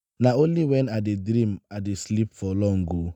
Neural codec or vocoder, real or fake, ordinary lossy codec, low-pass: none; real; none; 19.8 kHz